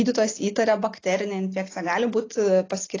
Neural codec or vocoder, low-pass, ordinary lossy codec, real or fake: none; 7.2 kHz; AAC, 32 kbps; real